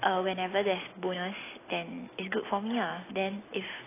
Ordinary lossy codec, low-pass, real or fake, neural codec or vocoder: AAC, 16 kbps; 3.6 kHz; real; none